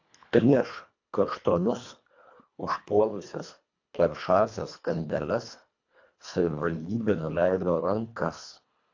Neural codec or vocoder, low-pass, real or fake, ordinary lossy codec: codec, 24 kHz, 1.5 kbps, HILCodec; 7.2 kHz; fake; AAC, 48 kbps